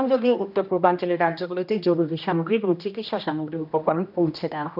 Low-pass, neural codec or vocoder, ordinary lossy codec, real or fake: 5.4 kHz; codec, 16 kHz, 1 kbps, X-Codec, HuBERT features, trained on general audio; none; fake